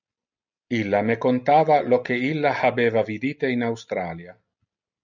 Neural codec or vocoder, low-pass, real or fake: none; 7.2 kHz; real